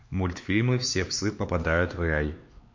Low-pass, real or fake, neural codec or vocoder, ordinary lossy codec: 7.2 kHz; fake; codec, 16 kHz, 4 kbps, X-Codec, HuBERT features, trained on LibriSpeech; MP3, 48 kbps